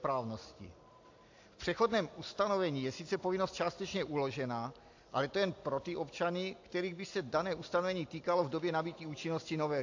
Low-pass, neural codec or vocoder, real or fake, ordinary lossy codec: 7.2 kHz; none; real; AAC, 48 kbps